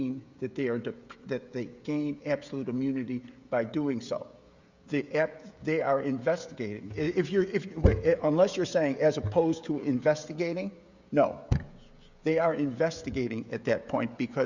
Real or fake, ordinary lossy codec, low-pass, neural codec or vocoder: fake; Opus, 64 kbps; 7.2 kHz; codec, 16 kHz, 16 kbps, FreqCodec, smaller model